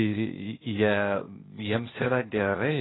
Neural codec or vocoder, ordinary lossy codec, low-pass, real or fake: codec, 16 kHz, 0.8 kbps, ZipCodec; AAC, 16 kbps; 7.2 kHz; fake